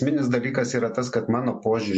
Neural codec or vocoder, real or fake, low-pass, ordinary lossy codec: none; real; 10.8 kHz; MP3, 48 kbps